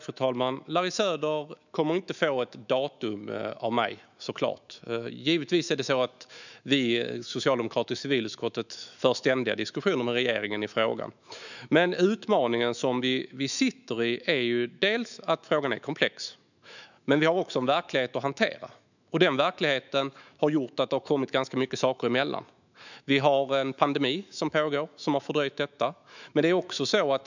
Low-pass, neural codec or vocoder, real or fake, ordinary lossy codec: 7.2 kHz; autoencoder, 48 kHz, 128 numbers a frame, DAC-VAE, trained on Japanese speech; fake; none